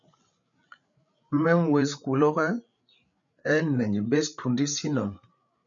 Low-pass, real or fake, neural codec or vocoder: 7.2 kHz; fake; codec, 16 kHz, 8 kbps, FreqCodec, larger model